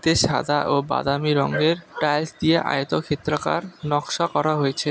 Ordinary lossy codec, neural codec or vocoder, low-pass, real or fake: none; none; none; real